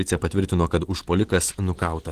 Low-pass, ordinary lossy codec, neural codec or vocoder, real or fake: 14.4 kHz; Opus, 16 kbps; none; real